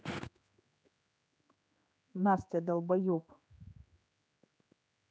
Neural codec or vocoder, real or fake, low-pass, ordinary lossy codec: codec, 16 kHz, 4 kbps, X-Codec, HuBERT features, trained on general audio; fake; none; none